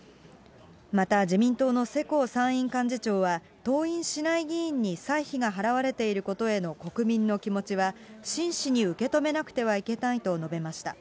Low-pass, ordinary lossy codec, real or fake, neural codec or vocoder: none; none; real; none